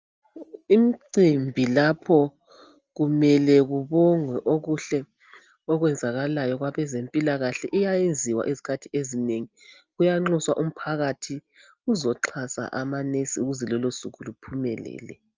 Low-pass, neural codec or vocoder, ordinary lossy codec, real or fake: 7.2 kHz; none; Opus, 24 kbps; real